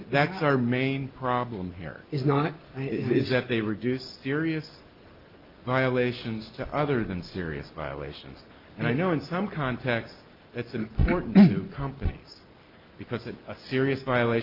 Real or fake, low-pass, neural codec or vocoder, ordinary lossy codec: real; 5.4 kHz; none; Opus, 24 kbps